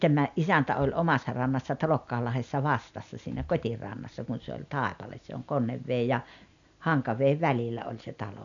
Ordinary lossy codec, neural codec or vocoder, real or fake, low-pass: none; none; real; 7.2 kHz